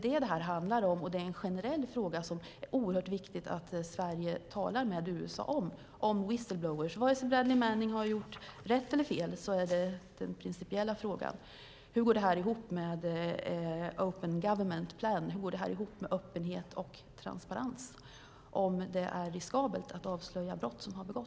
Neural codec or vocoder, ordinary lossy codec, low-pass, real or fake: none; none; none; real